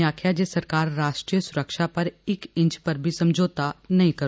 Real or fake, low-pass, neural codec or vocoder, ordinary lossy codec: real; none; none; none